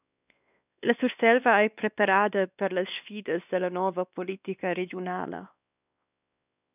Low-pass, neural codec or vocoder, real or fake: 3.6 kHz; codec, 16 kHz, 2 kbps, X-Codec, WavLM features, trained on Multilingual LibriSpeech; fake